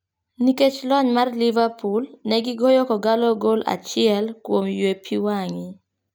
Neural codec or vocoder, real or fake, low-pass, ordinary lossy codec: none; real; none; none